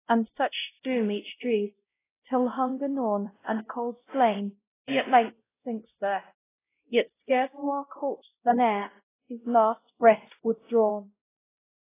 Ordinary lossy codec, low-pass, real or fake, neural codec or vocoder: AAC, 16 kbps; 3.6 kHz; fake; codec, 16 kHz, 0.5 kbps, X-Codec, WavLM features, trained on Multilingual LibriSpeech